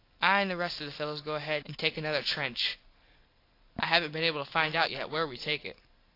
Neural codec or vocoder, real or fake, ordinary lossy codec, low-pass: none; real; AAC, 32 kbps; 5.4 kHz